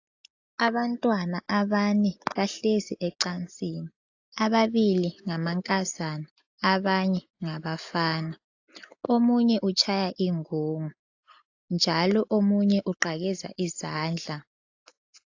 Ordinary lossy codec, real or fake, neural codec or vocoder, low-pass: AAC, 48 kbps; real; none; 7.2 kHz